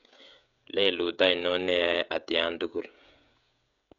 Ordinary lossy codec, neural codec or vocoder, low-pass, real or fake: none; codec, 16 kHz, 16 kbps, FreqCodec, smaller model; 7.2 kHz; fake